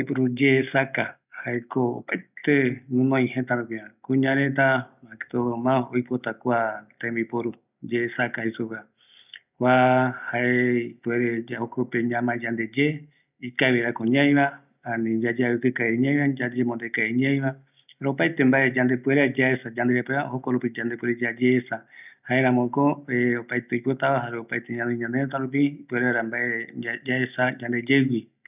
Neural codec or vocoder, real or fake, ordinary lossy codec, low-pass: none; real; none; 3.6 kHz